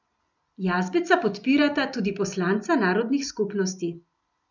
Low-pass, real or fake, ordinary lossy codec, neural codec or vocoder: 7.2 kHz; real; none; none